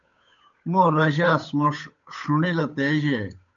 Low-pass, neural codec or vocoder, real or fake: 7.2 kHz; codec, 16 kHz, 8 kbps, FunCodec, trained on Chinese and English, 25 frames a second; fake